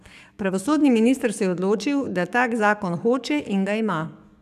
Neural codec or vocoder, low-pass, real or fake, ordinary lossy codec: codec, 44.1 kHz, 7.8 kbps, DAC; 14.4 kHz; fake; none